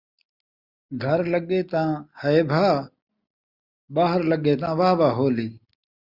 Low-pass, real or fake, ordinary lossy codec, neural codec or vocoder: 5.4 kHz; real; Opus, 64 kbps; none